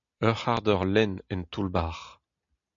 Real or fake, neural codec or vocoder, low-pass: real; none; 7.2 kHz